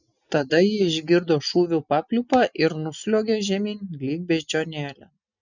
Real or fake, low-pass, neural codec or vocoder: real; 7.2 kHz; none